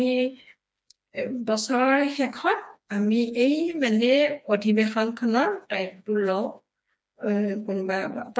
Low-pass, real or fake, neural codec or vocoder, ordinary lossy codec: none; fake; codec, 16 kHz, 2 kbps, FreqCodec, smaller model; none